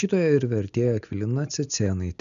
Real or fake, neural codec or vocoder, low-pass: real; none; 7.2 kHz